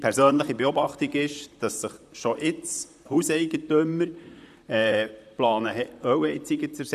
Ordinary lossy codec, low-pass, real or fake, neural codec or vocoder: none; 14.4 kHz; fake; vocoder, 44.1 kHz, 128 mel bands, Pupu-Vocoder